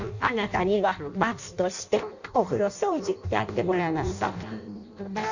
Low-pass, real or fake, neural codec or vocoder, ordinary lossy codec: 7.2 kHz; fake; codec, 16 kHz in and 24 kHz out, 0.6 kbps, FireRedTTS-2 codec; none